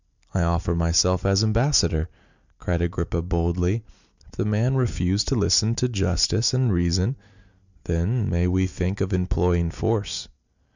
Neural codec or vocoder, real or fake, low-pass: none; real; 7.2 kHz